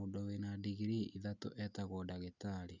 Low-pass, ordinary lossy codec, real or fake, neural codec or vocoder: 7.2 kHz; none; real; none